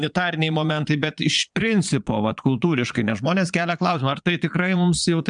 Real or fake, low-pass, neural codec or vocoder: fake; 9.9 kHz; vocoder, 22.05 kHz, 80 mel bands, Vocos